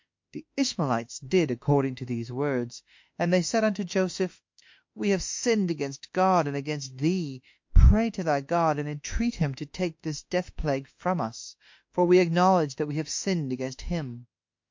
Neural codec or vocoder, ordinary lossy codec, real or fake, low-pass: autoencoder, 48 kHz, 32 numbers a frame, DAC-VAE, trained on Japanese speech; MP3, 48 kbps; fake; 7.2 kHz